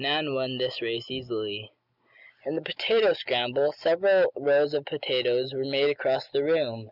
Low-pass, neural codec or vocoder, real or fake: 5.4 kHz; none; real